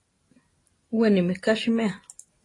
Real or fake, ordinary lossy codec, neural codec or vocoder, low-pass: real; AAC, 32 kbps; none; 10.8 kHz